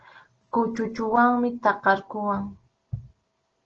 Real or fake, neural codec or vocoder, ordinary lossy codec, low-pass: real; none; Opus, 16 kbps; 7.2 kHz